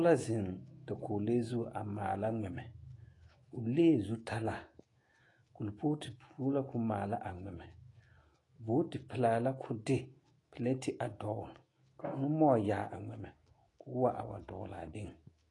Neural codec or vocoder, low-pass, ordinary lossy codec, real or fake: autoencoder, 48 kHz, 128 numbers a frame, DAC-VAE, trained on Japanese speech; 10.8 kHz; AAC, 32 kbps; fake